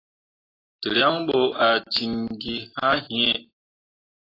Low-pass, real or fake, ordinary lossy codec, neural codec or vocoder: 5.4 kHz; real; AAC, 24 kbps; none